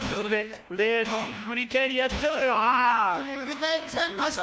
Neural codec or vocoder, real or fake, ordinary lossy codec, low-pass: codec, 16 kHz, 1 kbps, FunCodec, trained on LibriTTS, 50 frames a second; fake; none; none